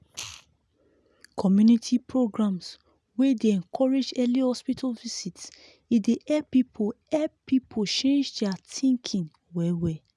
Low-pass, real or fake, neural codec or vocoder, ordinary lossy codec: none; real; none; none